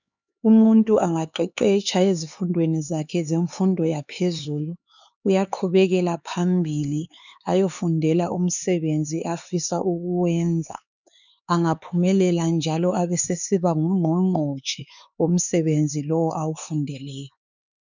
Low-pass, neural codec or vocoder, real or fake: 7.2 kHz; codec, 16 kHz, 4 kbps, X-Codec, HuBERT features, trained on LibriSpeech; fake